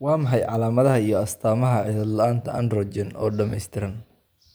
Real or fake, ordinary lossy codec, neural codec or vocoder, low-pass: real; none; none; none